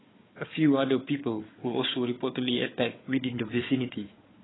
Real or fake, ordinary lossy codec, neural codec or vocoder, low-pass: fake; AAC, 16 kbps; codec, 16 kHz, 4 kbps, FunCodec, trained on Chinese and English, 50 frames a second; 7.2 kHz